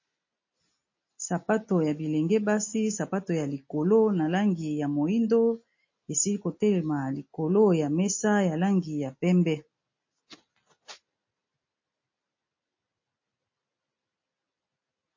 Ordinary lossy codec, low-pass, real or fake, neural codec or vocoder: MP3, 32 kbps; 7.2 kHz; real; none